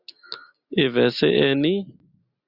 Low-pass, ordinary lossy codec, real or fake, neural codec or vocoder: 5.4 kHz; Opus, 64 kbps; real; none